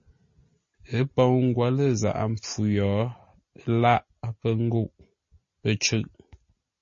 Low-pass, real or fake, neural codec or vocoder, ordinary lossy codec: 7.2 kHz; real; none; MP3, 32 kbps